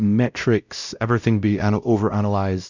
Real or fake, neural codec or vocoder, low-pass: fake; codec, 16 kHz, 0.5 kbps, X-Codec, WavLM features, trained on Multilingual LibriSpeech; 7.2 kHz